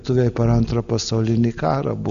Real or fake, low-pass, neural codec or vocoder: real; 7.2 kHz; none